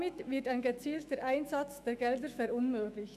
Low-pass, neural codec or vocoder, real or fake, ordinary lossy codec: 14.4 kHz; autoencoder, 48 kHz, 128 numbers a frame, DAC-VAE, trained on Japanese speech; fake; none